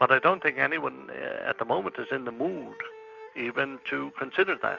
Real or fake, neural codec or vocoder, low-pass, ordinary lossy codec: real; none; 7.2 kHz; Opus, 64 kbps